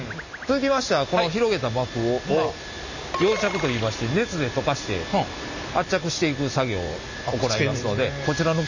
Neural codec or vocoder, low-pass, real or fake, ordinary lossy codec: none; 7.2 kHz; real; none